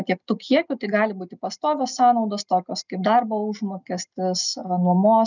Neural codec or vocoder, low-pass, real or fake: none; 7.2 kHz; real